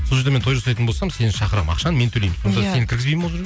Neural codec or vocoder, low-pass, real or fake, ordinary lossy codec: none; none; real; none